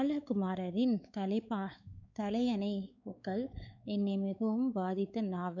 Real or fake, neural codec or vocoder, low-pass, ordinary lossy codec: fake; codec, 16 kHz, 4 kbps, X-Codec, WavLM features, trained on Multilingual LibriSpeech; 7.2 kHz; none